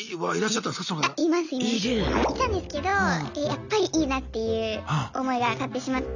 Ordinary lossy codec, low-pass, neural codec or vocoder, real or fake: none; 7.2 kHz; none; real